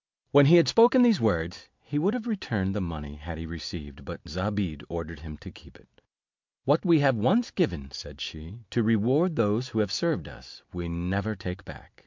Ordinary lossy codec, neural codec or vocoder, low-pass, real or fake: MP3, 64 kbps; none; 7.2 kHz; real